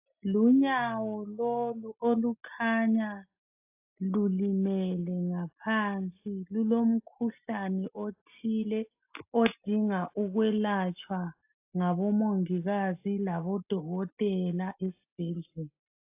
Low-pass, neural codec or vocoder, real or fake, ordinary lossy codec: 3.6 kHz; none; real; AAC, 32 kbps